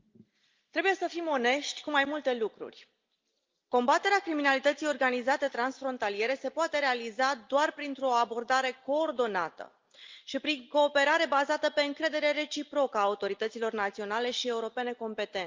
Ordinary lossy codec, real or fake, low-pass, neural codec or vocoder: Opus, 24 kbps; real; 7.2 kHz; none